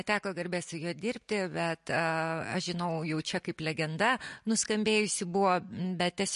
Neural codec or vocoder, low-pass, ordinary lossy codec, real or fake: none; 14.4 kHz; MP3, 48 kbps; real